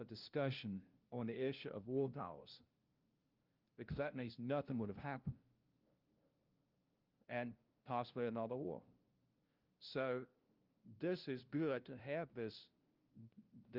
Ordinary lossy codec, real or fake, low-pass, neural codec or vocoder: Opus, 32 kbps; fake; 5.4 kHz; codec, 16 kHz, 0.5 kbps, FunCodec, trained on LibriTTS, 25 frames a second